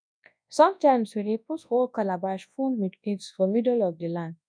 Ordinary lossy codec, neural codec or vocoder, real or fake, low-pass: none; codec, 24 kHz, 0.9 kbps, WavTokenizer, large speech release; fake; 9.9 kHz